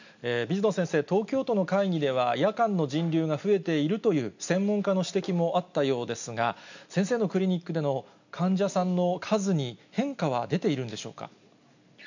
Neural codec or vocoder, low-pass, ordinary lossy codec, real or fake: none; 7.2 kHz; none; real